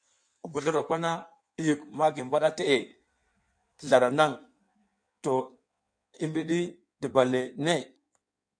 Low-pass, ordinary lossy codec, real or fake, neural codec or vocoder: 9.9 kHz; MP3, 64 kbps; fake; codec, 16 kHz in and 24 kHz out, 1.1 kbps, FireRedTTS-2 codec